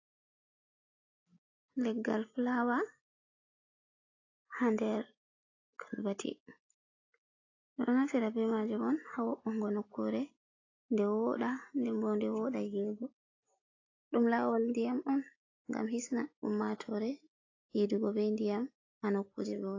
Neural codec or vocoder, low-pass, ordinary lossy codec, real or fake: none; 7.2 kHz; AAC, 32 kbps; real